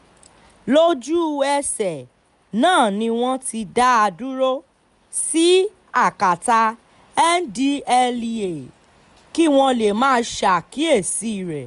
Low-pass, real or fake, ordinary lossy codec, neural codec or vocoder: 10.8 kHz; real; none; none